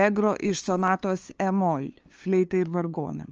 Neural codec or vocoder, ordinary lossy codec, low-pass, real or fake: codec, 16 kHz, 2 kbps, FunCodec, trained on LibriTTS, 25 frames a second; Opus, 24 kbps; 7.2 kHz; fake